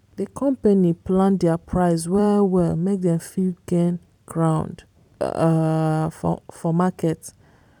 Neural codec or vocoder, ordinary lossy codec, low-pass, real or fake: vocoder, 44.1 kHz, 128 mel bands every 256 samples, BigVGAN v2; none; 19.8 kHz; fake